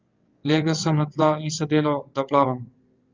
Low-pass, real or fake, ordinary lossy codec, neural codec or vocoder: 7.2 kHz; fake; Opus, 24 kbps; vocoder, 22.05 kHz, 80 mel bands, WaveNeXt